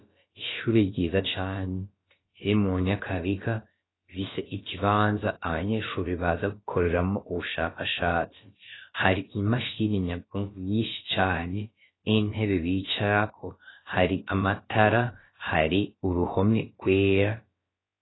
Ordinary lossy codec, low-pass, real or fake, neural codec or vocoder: AAC, 16 kbps; 7.2 kHz; fake; codec, 16 kHz, about 1 kbps, DyCAST, with the encoder's durations